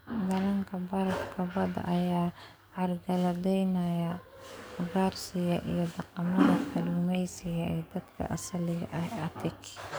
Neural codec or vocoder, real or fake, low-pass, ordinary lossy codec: codec, 44.1 kHz, 7.8 kbps, DAC; fake; none; none